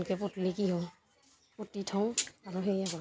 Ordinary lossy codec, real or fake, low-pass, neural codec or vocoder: none; real; none; none